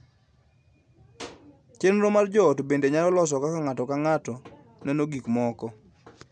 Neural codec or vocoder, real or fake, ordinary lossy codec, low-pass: none; real; none; 9.9 kHz